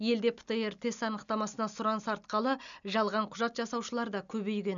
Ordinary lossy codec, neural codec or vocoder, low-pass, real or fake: none; none; 7.2 kHz; real